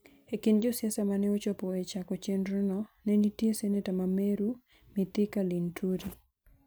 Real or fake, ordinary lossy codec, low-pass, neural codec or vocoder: real; none; none; none